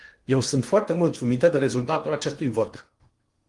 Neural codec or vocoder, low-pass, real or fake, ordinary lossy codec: codec, 16 kHz in and 24 kHz out, 0.8 kbps, FocalCodec, streaming, 65536 codes; 10.8 kHz; fake; Opus, 24 kbps